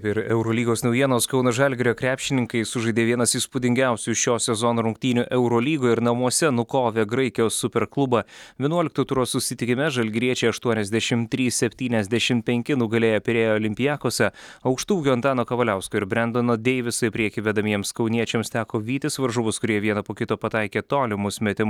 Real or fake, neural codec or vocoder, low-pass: real; none; 19.8 kHz